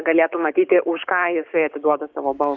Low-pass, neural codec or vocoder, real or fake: 7.2 kHz; codec, 16 kHz, 6 kbps, DAC; fake